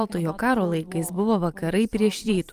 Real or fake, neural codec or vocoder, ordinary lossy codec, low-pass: fake; vocoder, 44.1 kHz, 128 mel bands every 512 samples, BigVGAN v2; Opus, 32 kbps; 14.4 kHz